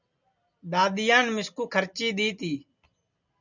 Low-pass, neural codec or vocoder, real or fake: 7.2 kHz; none; real